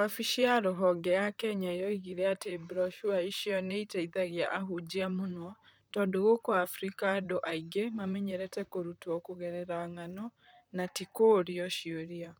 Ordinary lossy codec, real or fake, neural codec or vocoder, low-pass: none; fake; vocoder, 44.1 kHz, 128 mel bands, Pupu-Vocoder; none